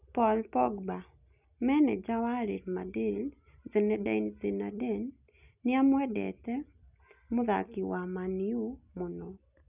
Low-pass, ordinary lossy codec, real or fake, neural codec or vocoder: 3.6 kHz; none; real; none